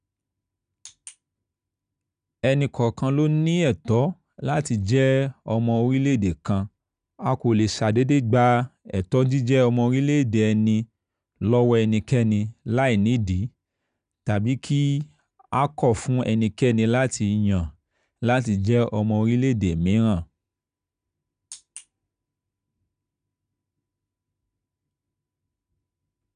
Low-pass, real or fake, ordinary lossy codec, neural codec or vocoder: 9.9 kHz; real; none; none